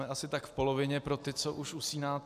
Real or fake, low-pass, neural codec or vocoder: real; 14.4 kHz; none